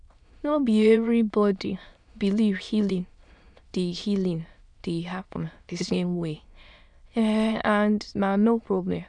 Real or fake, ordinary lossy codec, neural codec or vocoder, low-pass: fake; none; autoencoder, 22.05 kHz, a latent of 192 numbers a frame, VITS, trained on many speakers; 9.9 kHz